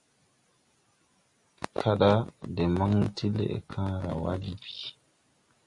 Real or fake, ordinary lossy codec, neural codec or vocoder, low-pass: real; Opus, 64 kbps; none; 10.8 kHz